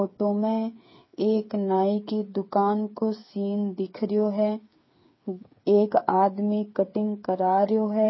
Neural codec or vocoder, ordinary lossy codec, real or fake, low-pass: codec, 16 kHz, 8 kbps, FreqCodec, smaller model; MP3, 24 kbps; fake; 7.2 kHz